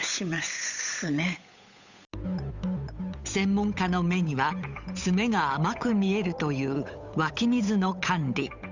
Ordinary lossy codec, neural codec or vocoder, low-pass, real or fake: none; codec, 16 kHz, 8 kbps, FunCodec, trained on Chinese and English, 25 frames a second; 7.2 kHz; fake